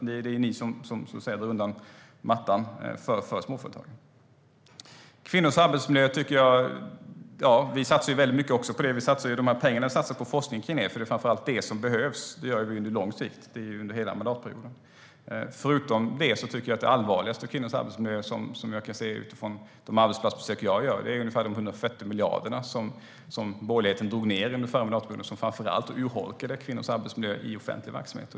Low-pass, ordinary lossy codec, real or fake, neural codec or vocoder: none; none; real; none